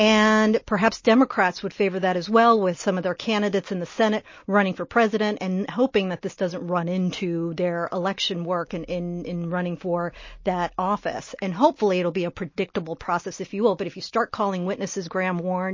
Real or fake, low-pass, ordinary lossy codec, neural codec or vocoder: real; 7.2 kHz; MP3, 32 kbps; none